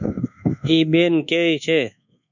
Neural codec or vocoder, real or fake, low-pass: codec, 24 kHz, 1.2 kbps, DualCodec; fake; 7.2 kHz